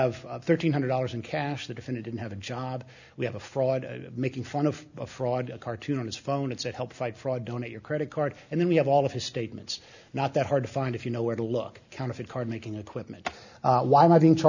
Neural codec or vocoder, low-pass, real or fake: none; 7.2 kHz; real